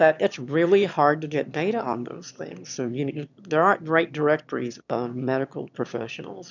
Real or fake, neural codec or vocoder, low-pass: fake; autoencoder, 22.05 kHz, a latent of 192 numbers a frame, VITS, trained on one speaker; 7.2 kHz